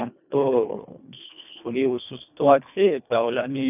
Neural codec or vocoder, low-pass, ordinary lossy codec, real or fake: codec, 24 kHz, 1.5 kbps, HILCodec; 3.6 kHz; none; fake